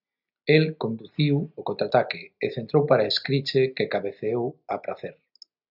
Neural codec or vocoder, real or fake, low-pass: none; real; 5.4 kHz